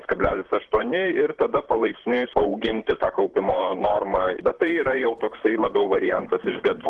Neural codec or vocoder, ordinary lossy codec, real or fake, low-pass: vocoder, 44.1 kHz, 128 mel bands, Pupu-Vocoder; Opus, 16 kbps; fake; 10.8 kHz